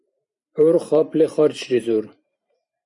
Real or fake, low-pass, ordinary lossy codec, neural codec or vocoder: fake; 10.8 kHz; MP3, 48 kbps; vocoder, 44.1 kHz, 128 mel bands every 512 samples, BigVGAN v2